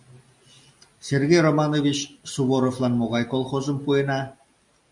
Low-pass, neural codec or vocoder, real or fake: 10.8 kHz; none; real